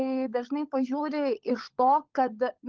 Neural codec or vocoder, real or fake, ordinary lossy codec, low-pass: codec, 16 kHz, 16 kbps, FunCodec, trained on LibriTTS, 50 frames a second; fake; Opus, 32 kbps; 7.2 kHz